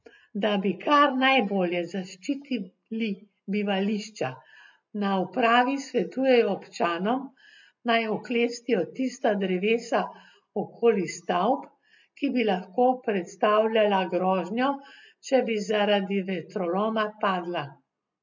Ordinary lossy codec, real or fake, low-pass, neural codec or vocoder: MP3, 64 kbps; real; 7.2 kHz; none